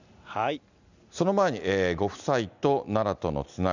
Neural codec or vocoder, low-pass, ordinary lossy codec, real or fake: none; 7.2 kHz; none; real